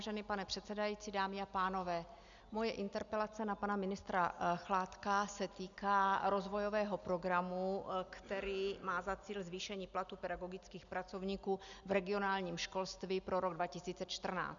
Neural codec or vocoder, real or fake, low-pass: none; real; 7.2 kHz